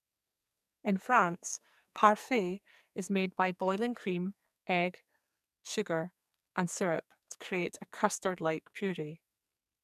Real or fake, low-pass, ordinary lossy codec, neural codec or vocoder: fake; 14.4 kHz; none; codec, 44.1 kHz, 2.6 kbps, SNAC